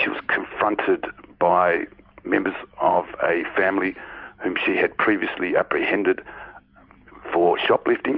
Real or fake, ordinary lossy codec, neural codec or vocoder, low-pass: real; Opus, 64 kbps; none; 5.4 kHz